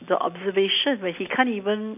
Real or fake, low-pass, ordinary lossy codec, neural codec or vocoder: real; 3.6 kHz; AAC, 32 kbps; none